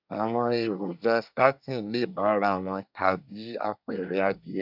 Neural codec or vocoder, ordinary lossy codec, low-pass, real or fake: codec, 24 kHz, 1 kbps, SNAC; none; 5.4 kHz; fake